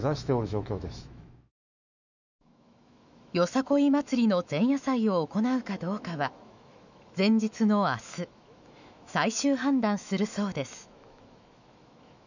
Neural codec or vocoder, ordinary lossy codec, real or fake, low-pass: autoencoder, 48 kHz, 128 numbers a frame, DAC-VAE, trained on Japanese speech; none; fake; 7.2 kHz